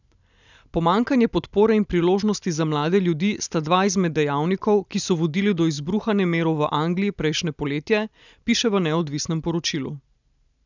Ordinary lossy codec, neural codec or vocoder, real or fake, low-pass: none; none; real; 7.2 kHz